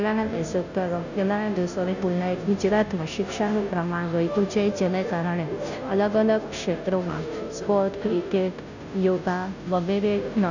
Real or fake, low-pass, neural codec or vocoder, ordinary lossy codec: fake; 7.2 kHz; codec, 16 kHz, 0.5 kbps, FunCodec, trained on Chinese and English, 25 frames a second; none